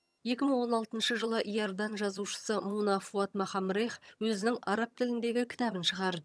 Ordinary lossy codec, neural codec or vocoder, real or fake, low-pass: none; vocoder, 22.05 kHz, 80 mel bands, HiFi-GAN; fake; none